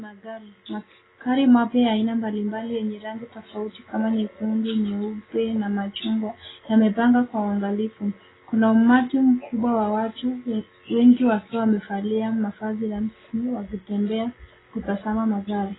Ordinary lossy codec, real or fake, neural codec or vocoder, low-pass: AAC, 16 kbps; real; none; 7.2 kHz